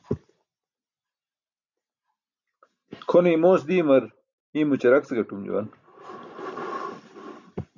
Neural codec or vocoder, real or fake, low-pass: none; real; 7.2 kHz